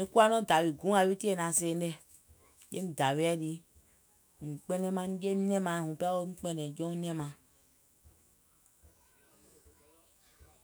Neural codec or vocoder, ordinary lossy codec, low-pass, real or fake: autoencoder, 48 kHz, 128 numbers a frame, DAC-VAE, trained on Japanese speech; none; none; fake